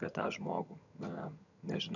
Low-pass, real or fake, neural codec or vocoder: 7.2 kHz; fake; vocoder, 22.05 kHz, 80 mel bands, HiFi-GAN